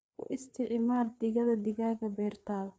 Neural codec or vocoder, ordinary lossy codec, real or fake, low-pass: codec, 16 kHz, 4 kbps, FreqCodec, larger model; none; fake; none